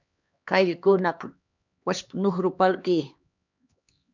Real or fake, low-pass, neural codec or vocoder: fake; 7.2 kHz; codec, 16 kHz, 2 kbps, X-Codec, HuBERT features, trained on LibriSpeech